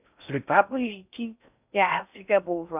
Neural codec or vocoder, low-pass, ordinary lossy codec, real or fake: codec, 16 kHz in and 24 kHz out, 0.6 kbps, FocalCodec, streaming, 4096 codes; 3.6 kHz; none; fake